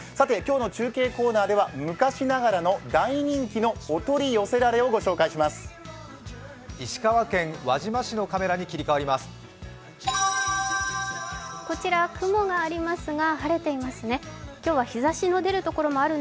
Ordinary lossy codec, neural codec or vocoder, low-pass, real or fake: none; none; none; real